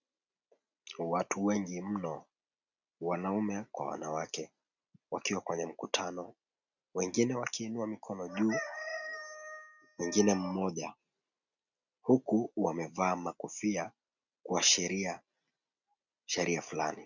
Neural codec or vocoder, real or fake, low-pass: none; real; 7.2 kHz